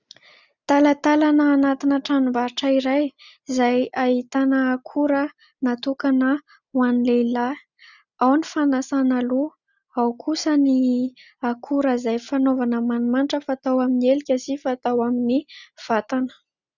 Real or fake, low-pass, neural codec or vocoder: real; 7.2 kHz; none